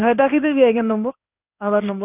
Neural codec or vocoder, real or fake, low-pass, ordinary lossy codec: codec, 16 kHz in and 24 kHz out, 1 kbps, XY-Tokenizer; fake; 3.6 kHz; none